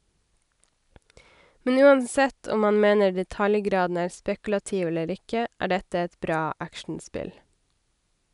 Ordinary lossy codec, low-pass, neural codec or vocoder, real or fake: none; 10.8 kHz; none; real